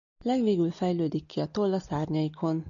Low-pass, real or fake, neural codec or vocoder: 7.2 kHz; real; none